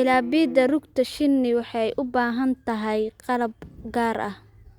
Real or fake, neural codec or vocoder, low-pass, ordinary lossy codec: real; none; 19.8 kHz; Opus, 64 kbps